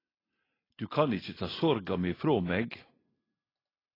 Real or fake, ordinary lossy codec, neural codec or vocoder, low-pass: real; AAC, 24 kbps; none; 5.4 kHz